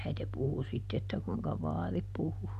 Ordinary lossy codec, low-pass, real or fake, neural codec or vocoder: none; 14.4 kHz; real; none